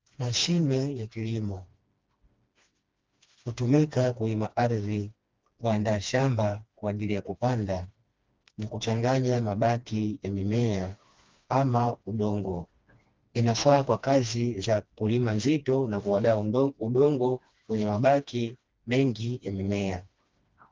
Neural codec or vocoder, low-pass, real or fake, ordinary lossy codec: codec, 16 kHz, 2 kbps, FreqCodec, smaller model; 7.2 kHz; fake; Opus, 24 kbps